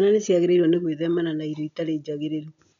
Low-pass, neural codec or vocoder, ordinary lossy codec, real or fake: 7.2 kHz; none; none; real